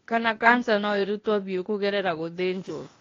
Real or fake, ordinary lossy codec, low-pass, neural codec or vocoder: fake; AAC, 32 kbps; 7.2 kHz; codec, 16 kHz, 0.8 kbps, ZipCodec